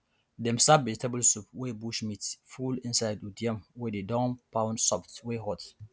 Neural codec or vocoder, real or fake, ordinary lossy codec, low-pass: none; real; none; none